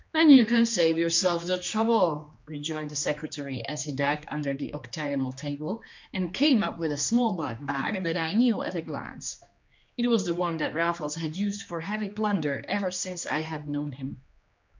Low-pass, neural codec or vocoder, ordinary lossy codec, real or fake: 7.2 kHz; codec, 16 kHz, 2 kbps, X-Codec, HuBERT features, trained on general audio; MP3, 64 kbps; fake